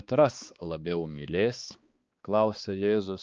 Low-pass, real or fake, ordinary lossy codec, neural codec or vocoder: 7.2 kHz; fake; Opus, 24 kbps; codec, 16 kHz, 2 kbps, X-Codec, HuBERT features, trained on balanced general audio